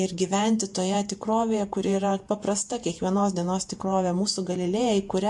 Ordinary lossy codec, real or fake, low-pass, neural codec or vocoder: MP3, 64 kbps; fake; 10.8 kHz; vocoder, 48 kHz, 128 mel bands, Vocos